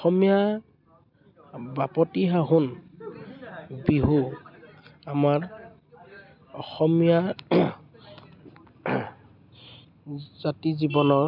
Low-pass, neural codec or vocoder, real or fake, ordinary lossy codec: 5.4 kHz; none; real; none